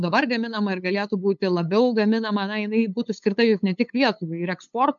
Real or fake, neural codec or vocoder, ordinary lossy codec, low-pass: fake; codec, 16 kHz, 8 kbps, FunCodec, trained on LibriTTS, 25 frames a second; MP3, 64 kbps; 7.2 kHz